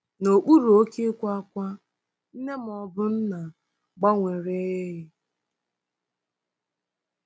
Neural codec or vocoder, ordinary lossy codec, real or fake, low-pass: none; none; real; none